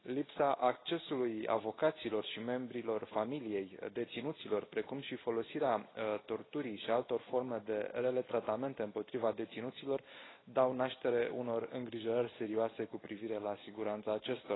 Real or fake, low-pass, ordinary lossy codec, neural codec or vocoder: real; 7.2 kHz; AAC, 16 kbps; none